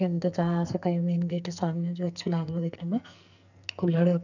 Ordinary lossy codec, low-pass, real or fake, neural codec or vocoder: none; 7.2 kHz; fake; codec, 44.1 kHz, 2.6 kbps, SNAC